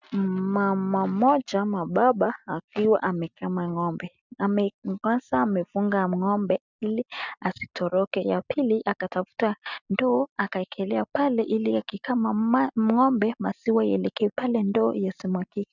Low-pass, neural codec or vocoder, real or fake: 7.2 kHz; none; real